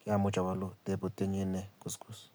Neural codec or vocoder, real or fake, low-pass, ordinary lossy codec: none; real; none; none